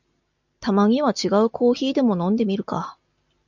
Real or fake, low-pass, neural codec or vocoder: real; 7.2 kHz; none